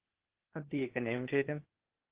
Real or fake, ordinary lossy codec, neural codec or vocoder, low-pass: fake; Opus, 16 kbps; codec, 16 kHz, 0.8 kbps, ZipCodec; 3.6 kHz